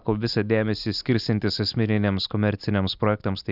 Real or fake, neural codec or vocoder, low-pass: real; none; 5.4 kHz